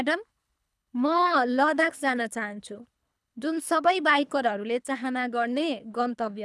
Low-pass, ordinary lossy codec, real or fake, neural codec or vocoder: none; none; fake; codec, 24 kHz, 3 kbps, HILCodec